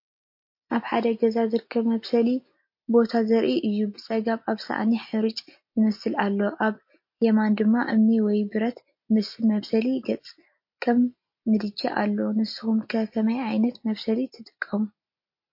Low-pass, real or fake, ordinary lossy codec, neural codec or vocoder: 5.4 kHz; real; MP3, 32 kbps; none